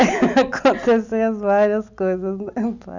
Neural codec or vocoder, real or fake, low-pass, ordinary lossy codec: none; real; 7.2 kHz; none